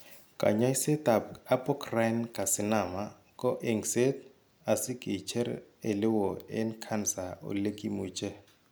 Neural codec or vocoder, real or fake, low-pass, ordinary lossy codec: none; real; none; none